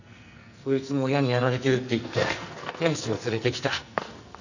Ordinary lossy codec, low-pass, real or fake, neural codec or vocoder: none; 7.2 kHz; fake; codec, 44.1 kHz, 2.6 kbps, SNAC